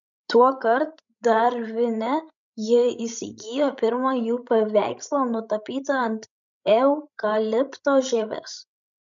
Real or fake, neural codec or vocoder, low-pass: fake; codec, 16 kHz, 16 kbps, FreqCodec, larger model; 7.2 kHz